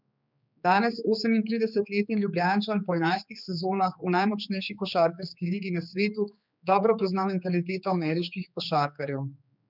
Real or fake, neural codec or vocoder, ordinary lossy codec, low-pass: fake; codec, 16 kHz, 4 kbps, X-Codec, HuBERT features, trained on general audio; none; 5.4 kHz